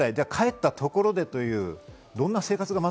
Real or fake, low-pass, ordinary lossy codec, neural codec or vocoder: real; none; none; none